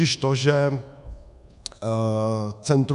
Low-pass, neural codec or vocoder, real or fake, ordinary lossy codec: 10.8 kHz; codec, 24 kHz, 1.2 kbps, DualCodec; fake; MP3, 96 kbps